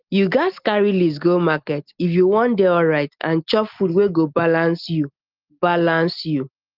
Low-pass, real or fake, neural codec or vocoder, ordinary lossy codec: 5.4 kHz; real; none; Opus, 32 kbps